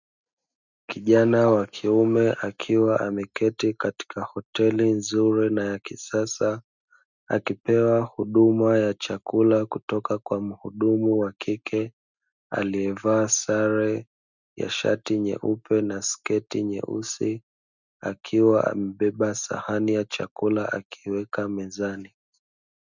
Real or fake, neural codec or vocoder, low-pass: real; none; 7.2 kHz